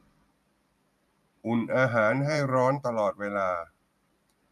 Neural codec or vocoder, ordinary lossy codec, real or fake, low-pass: vocoder, 44.1 kHz, 128 mel bands every 512 samples, BigVGAN v2; none; fake; 14.4 kHz